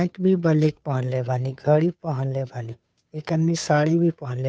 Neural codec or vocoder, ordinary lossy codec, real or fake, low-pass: codec, 16 kHz, 2 kbps, FunCodec, trained on Chinese and English, 25 frames a second; none; fake; none